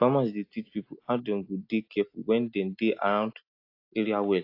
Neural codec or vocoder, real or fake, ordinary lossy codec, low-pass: none; real; AAC, 32 kbps; 5.4 kHz